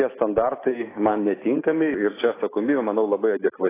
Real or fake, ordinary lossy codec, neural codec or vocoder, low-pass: real; AAC, 16 kbps; none; 3.6 kHz